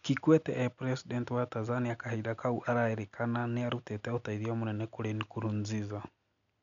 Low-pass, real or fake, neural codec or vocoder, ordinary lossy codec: 7.2 kHz; real; none; none